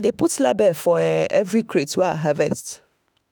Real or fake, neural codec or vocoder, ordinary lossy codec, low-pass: fake; autoencoder, 48 kHz, 32 numbers a frame, DAC-VAE, trained on Japanese speech; none; none